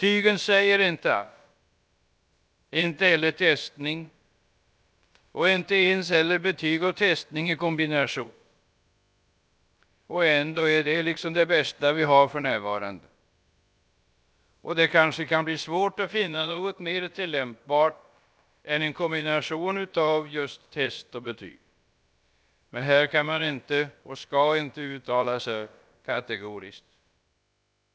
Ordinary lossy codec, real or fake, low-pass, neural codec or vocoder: none; fake; none; codec, 16 kHz, about 1 kbps, DyCAST, with the encoder's durations